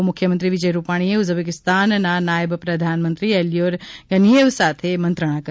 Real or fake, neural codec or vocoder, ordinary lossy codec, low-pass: real; none; none; none